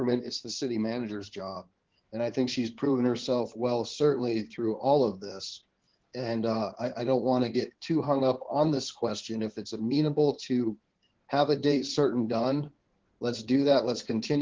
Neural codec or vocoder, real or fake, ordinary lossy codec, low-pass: codec, 16 kHz in and 24 kHz out, 2.2 kbps, FireRedTTS-2 codec; fake; Opus, 16 kbps; 7.2 kHz